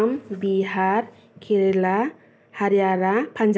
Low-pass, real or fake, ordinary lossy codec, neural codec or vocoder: none; real; none; none